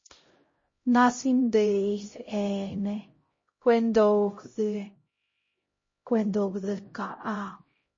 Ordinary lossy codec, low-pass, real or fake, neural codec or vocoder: MP3, 32 kbps; 7.2 kHz; fake; codec, 16 kHz, 0.5 kbps, X-Codec, HuBERT features, trained on LibriSpeech